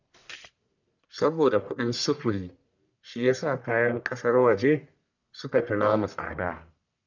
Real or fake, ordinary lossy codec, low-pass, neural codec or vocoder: fake; none; 7.2 kHz; codec, 44.1 kHz, 1.7 kbps, Pupu-Codec